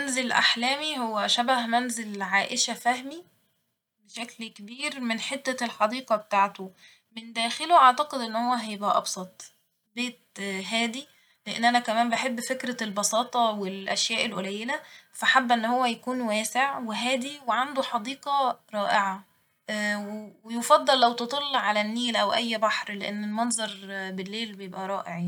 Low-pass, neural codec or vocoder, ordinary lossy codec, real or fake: 19.8 kHz; none; none; real